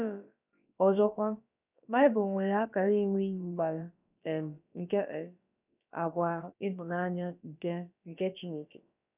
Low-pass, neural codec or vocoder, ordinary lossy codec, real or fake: 3.6 kHz; codec, 16 kHz, about 1 kbps, DyCAST, with the encoder's durations; none; fake